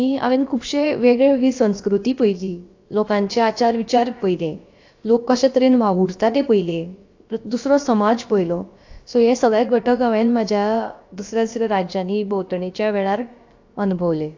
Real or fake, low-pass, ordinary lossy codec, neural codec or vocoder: fake; 7.2 kHz; AAC, 48 kbps; codec, 16 kHz, about 1 kbps, DyCAST, with the encoder's durations